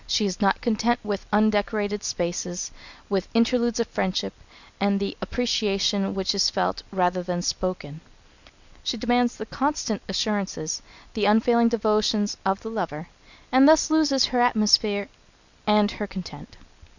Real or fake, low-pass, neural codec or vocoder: real; 7.2 kHz; none